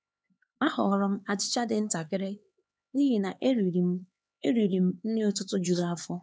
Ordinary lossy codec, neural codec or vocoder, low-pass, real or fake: none; codec, 16 kHz, 4 kbps, X-Codec, HuBERT features, trained on LibriSpeech; none; fake